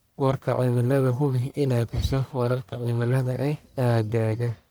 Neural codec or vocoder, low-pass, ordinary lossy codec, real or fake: codec, 44.1 kHz, 1.7 kbps, Pupu-Codec; none; none; fake